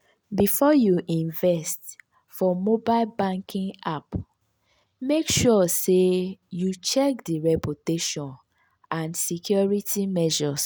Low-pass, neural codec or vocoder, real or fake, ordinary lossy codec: none; none; real; none